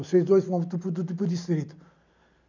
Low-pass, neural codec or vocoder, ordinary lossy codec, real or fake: 7.2 kHz; none; none; real